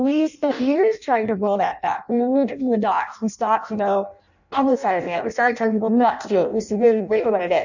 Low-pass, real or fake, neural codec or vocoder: 7.2 kHz; fake; codec, 16 kHz in and 24 kHz out, 0.6 kbps, FireRedTTS-2 codec